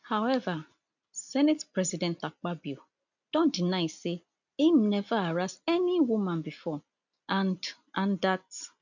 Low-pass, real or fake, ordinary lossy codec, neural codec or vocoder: 7.2 kHz; real; none; none